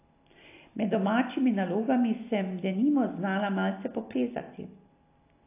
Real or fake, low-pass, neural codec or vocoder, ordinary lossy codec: real; 3.6 kHz; none; AAC, 24 kbps